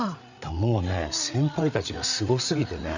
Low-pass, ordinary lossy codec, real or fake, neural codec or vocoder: 7.2 kHz; none; fake; codec, 16 kHz, 8 kbps, FreqCodec, larger model